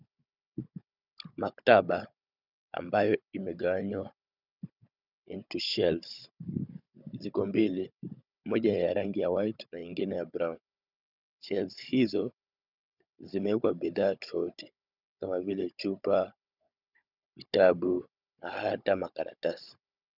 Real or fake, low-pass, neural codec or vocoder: fake; 5.4 kHz; codec, 16 kHz, 16 kbps, FunCodec, trained on Chinese and English, 50 frames a second